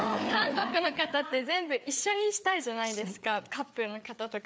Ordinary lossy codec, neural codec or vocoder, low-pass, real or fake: none; codec, 16 kHz, 4 kbps, FreqCodec, larger model; none; fake